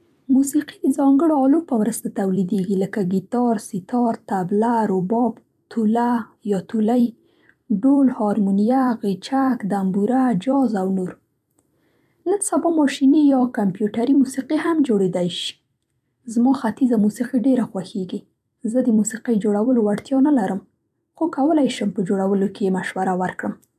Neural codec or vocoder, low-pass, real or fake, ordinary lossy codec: vocoder, 44.1 kHz, 128 mel bands every 256 samples, BigVGAN v2; 14.4 kHz; fake; none